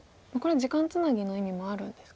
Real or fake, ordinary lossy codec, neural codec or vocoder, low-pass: real; none; none; none